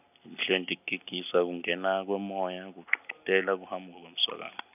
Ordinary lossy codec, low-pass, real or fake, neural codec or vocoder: none; 3.6 kHz; real; none